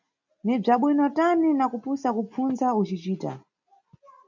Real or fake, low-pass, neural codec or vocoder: real; 7.2 kHz; none